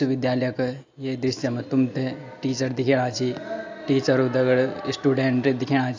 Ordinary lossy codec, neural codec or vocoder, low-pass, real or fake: AAC, 48 kbps; none; 7.2 kHz; real